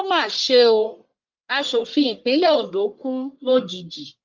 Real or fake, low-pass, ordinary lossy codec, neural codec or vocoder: fake; 7.2 kHz; Opus, 32 kbps; codec, 44.1 kHz, 1.7 kbps, Pupu-Codec